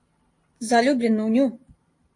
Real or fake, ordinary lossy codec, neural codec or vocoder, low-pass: fake; AAC, 48 kbps; vocoder, 44.1 kHz, 128 mel bands every 512 samples, BigVGAN v2; 10.8 kHz